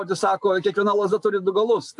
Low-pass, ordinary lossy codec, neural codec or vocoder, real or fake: 10.8 kHz; AAC, 64 kbps; none; real